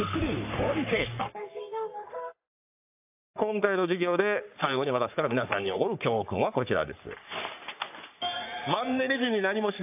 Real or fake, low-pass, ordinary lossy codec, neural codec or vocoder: fake; 3.6 kHz; AAC, 32 kbps; codec, 44.1 kHz, 3.4 kbps, Pupu-Codec